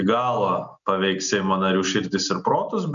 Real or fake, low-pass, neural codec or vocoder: real; 7.2 kHz; none